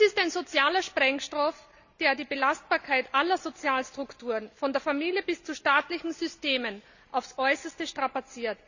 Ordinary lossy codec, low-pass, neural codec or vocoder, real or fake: none; 7.2 kHz; none; real